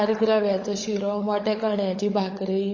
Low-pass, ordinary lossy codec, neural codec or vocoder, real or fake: 7.2 kHz; MP3, 32 kbps; codec, 16 kHz, 16 kbps, FunCodec, trained on LibriTTS, 50 frames a second; fake